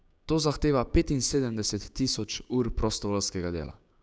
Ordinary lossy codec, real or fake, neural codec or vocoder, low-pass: none; fake; codec, 16 kHz, 6 kbps, DAC; none